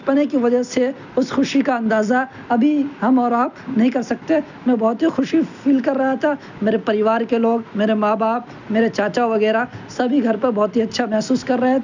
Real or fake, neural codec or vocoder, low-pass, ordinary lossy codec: real; none; 7.2 kHz; none